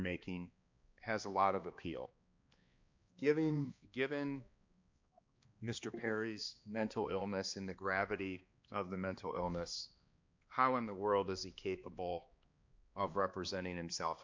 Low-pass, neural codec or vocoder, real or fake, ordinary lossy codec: 7.2 kHz; codec, 16 kHz, 2 kbps, X-Codec, HuBERT features, trained on balanced general audio; fake; MP3, 64 kbps